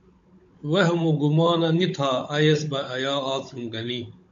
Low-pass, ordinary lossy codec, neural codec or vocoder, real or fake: 7.2 kHz; MP3, 48 kbps; codec, 16 kHz, 16 kbps, FunCodec, trained on Chinese and English, 50 frames a second; fake